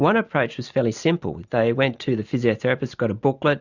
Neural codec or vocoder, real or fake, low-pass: none; real; 7.2 kHz